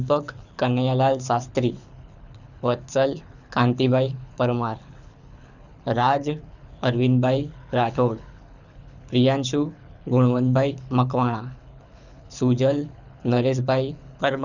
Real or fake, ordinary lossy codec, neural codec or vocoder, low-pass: fake; none; codec, 24 kHz, 6 kbps, HILCodec; 7.2 kHz